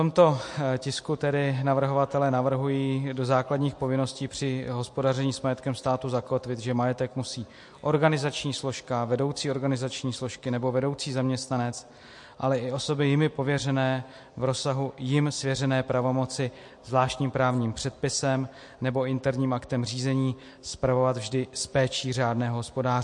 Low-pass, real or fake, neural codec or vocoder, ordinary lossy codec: 10.8 kHz; real; none; MP3, 48 kbps